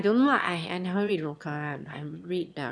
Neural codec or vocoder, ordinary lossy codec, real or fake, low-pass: autoencoder, 22.05 kHz, a latent of 192 numbers a frame, VITS, trained on one speaker; none; fake; none